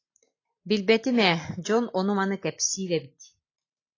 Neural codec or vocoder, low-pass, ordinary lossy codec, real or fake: none; 7.2 kHz; AAC, 32 kbps; real